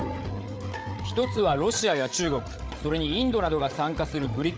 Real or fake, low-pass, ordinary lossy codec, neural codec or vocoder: fake; none; none; codec, 16 kHz, 8 kbps, FreqCodec, larger model